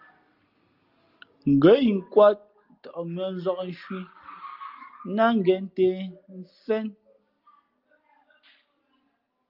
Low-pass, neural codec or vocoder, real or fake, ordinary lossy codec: 5.4 kHz; none; real; Opus, 24 kbps